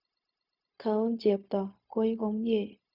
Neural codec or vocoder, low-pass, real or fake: codec, 16 kHz, 0.4 kbps, LongCat-Audio-Codec; 5.4 kHz; fake